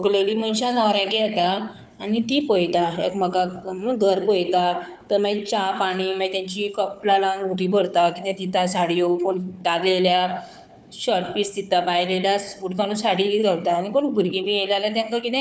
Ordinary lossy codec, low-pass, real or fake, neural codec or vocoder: none; none; fake; codec, 16 kHz, 4 kbps, FunCodec, trained on Chinese and English, 50 frames a second